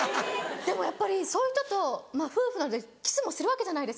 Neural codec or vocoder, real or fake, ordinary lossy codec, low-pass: none; real; none; none